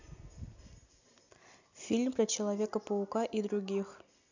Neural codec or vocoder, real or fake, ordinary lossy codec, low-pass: none; real; none; 7.2 kHz